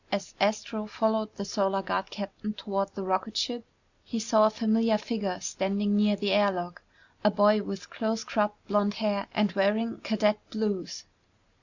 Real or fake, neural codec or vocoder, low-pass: real; none; 7.2 kHz